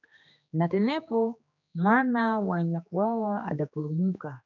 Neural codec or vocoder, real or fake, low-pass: codec, 16 kHz, 2 kbps, X-Codec, HuBERT features, trained on general audio; fake; 7.2 kHz